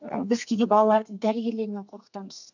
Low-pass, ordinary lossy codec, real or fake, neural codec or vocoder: 7.2 kHz; none; fake; codec, 32 kHz, 1.9 kbps, SNAC